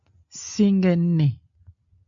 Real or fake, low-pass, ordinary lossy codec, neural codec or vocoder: real; 7.2 kHz; MP3, 48 kbps; none